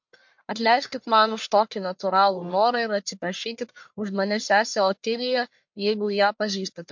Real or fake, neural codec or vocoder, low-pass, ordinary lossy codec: fake; codec, 44.1 kHz, 1.7 kbps, Pupu-Codec; 7.2 kHz; MP3, 48 kbps